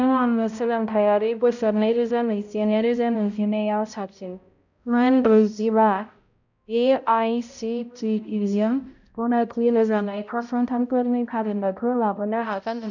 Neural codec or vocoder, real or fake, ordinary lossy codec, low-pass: codec, 16 kHz, 0.5 kbps, X-Codec, HuBERT features, trained on balanced general audio; fake; none; 7.2 kHz